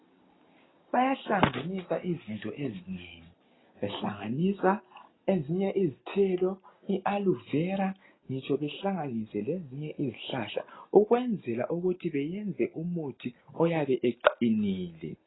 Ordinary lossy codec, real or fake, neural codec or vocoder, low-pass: AAC, 16 kbps; real; none; 7.2 kHz